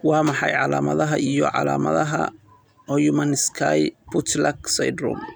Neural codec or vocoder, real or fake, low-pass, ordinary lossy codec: none; real; none; none